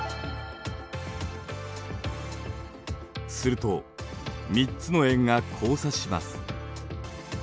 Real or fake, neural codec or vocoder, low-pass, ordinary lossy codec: real; none; none; none